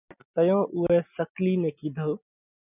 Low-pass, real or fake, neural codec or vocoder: 3.6 kHz; real; none